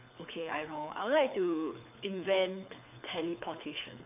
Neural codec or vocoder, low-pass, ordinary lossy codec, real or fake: codec, 24 kHz, 6 kbps, HILCodec; 3.6 kHz; none; fake